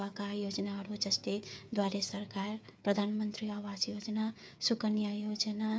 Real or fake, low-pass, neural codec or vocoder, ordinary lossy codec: fake; none; codec, 16 kHz, 8 kbps, FreqCodec, smaller model; none